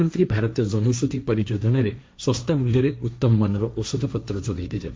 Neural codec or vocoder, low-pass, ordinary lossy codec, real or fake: codec, 16 kHz, 1.1 kbps, Voila-Tokenizer; none; none; fake